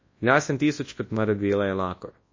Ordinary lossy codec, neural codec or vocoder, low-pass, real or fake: MP3, 32 kbps; codec, 24 kHz, 0.9 kbps, WavTokenizer, large speech release; 7.2 kHz; fake